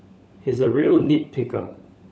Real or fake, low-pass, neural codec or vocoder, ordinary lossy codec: fake; none; codec, 16 kHz, 16 kbps, FunCodec, trained on LibriTTS, 50 frames a second; none